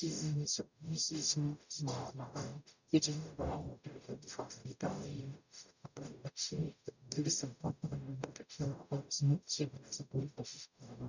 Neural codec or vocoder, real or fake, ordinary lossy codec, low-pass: codec, 44.1 kHz, 0.9 kbps, DAC; fake; none; 7.2 kHz